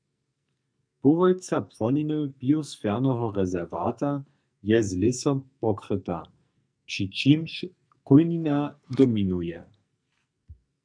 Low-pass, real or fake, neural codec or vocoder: 9.9 kHz; fake; codec, 44.1 kHz, 2.6 kbps, SNAC